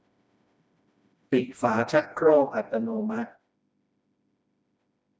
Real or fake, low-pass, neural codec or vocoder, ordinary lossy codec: fake; none; codec, 16 kHz, 1 kbps, FreqCodec, smaller model; none